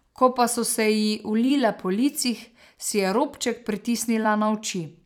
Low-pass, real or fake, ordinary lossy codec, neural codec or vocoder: 19.8 kHz; fake; none; vocoder, 44.1 kHz, 128 mel bands every 256 samples, BigVGAN v2